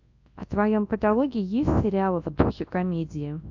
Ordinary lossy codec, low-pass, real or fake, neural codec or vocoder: AAC, 48 kbps; 7.2 kHz; fake; codec, 24 kHz, 0.9 kbps, WavTokenizer, large speech release